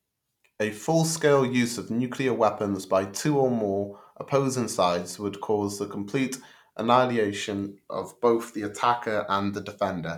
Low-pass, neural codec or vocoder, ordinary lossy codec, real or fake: 19.8 kHz; none; none; real